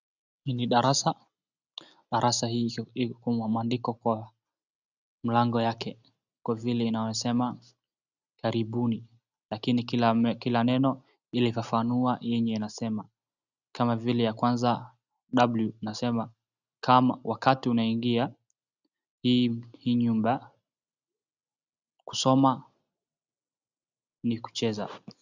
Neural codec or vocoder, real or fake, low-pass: none; real; 7.2 kHz